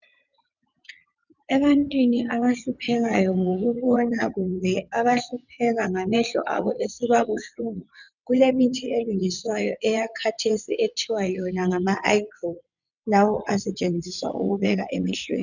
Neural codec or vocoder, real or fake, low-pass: vocoder, 22.05 kHz, 80 mel bands, WaveNeXt; fake; 7.2 kHz